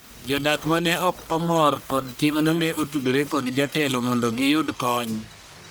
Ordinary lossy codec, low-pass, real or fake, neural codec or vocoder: none; none; fake; codec, 44.1 kHz, 1.7 kbps, Pupu-Codec